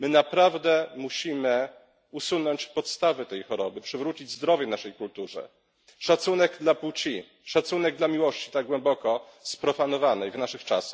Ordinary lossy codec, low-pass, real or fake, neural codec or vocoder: none; none; real; none